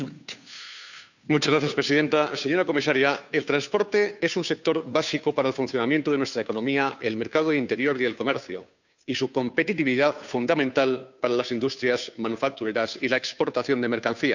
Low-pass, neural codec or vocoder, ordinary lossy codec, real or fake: 7.2 kHz; codec, 16 kHz, 2 kbps, FunCodec, trained on Chinese and English, 25 frames a second; none; fake